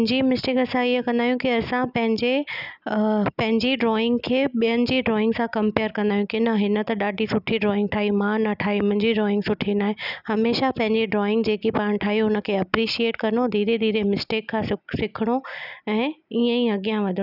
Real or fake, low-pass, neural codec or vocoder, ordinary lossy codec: real; 5.4 kHz; none; none